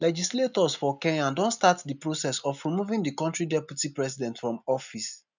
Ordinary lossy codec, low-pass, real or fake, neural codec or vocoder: none; 7.2 kHz; real; none